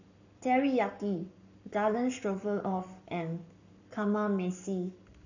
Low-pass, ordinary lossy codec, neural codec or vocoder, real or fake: 7.2 kHz; none; codec, 44.1 kHz, 7.8 kbps, Pupu-Codec; fake